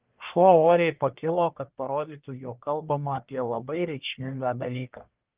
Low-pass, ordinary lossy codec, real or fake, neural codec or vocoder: 3.6 kHz; Opus, 32 kbps; fake; codec, 44.1 kHz, 1.7 kbps, Pupu-Codec